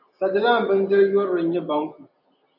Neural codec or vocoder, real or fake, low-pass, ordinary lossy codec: none; real; 5.4 kHz; AAC, 48 kbps